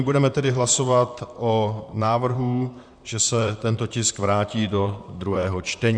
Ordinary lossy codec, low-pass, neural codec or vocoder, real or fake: MP3, 96 kbps; 9.9 kHz; vocoder, 44.1 kHz, 128 mel bands, Pupu-Vocoder; fake